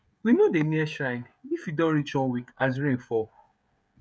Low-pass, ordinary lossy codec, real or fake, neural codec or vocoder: none; none; fake; codec, 16 kHz, 16 kbps, FreqCodec, smaller model